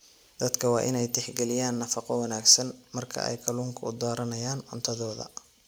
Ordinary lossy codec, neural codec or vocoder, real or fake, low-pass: none; none; real; none